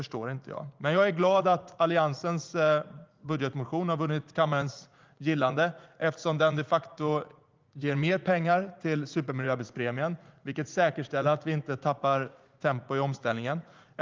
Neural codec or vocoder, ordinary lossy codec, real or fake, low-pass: vocoder, 44.1 kHz, 80 mel bands, Vocos; Opus, 32 kbps; fake; 7.2 kHz